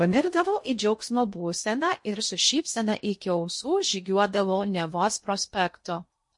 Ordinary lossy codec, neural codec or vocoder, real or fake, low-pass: MP3, 48 kbps; codec, 16 kHz in and 24 kHz out, 0.6 kbps, FocalCodec, streaming, 2048 codes; fake; 10.8 kHz